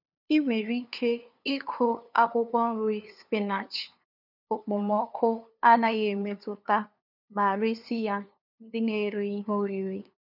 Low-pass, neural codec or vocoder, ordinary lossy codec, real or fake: 5.4 kHz; codec, 16 kHz, 2 kbps, FunCodec, trained on LibriTTS, 25 frames a second; none; fake